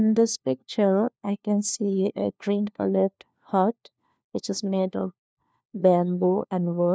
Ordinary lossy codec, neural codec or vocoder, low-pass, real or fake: none; codec, 16 kHz, 1 kbps, FunCodec, trained on LibriTTS, 50 frames a second; none; fake